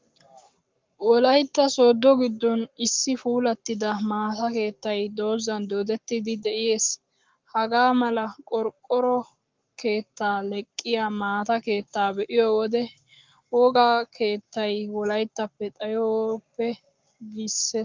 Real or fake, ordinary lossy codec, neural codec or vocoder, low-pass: fake; Opus, 24 kbps; codec, 44.1 kHz, 7.8 kbps, Pupu-Codec; 7.2 kHz